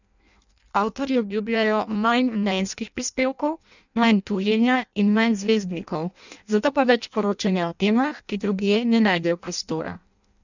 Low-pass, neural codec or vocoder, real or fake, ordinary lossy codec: 7.2 kHz; codec, 16 kHz in and 24 kHz out, 0.6 kbps, FireRedTTS-2 codec; fake; none